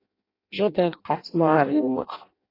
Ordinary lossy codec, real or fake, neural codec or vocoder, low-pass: AAC, 24 kbps; fake; codec, 16 kHz in and 24 kHz out, 0.6 kbps, FireRedTTS-2 codec; 5.4 kHz